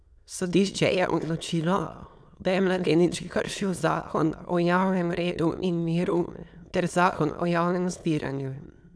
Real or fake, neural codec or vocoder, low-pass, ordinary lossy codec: fake; autoencoder, 22.05 kHz, a latent of 192 numbers a frame, VITS, trained on many speakers; none; none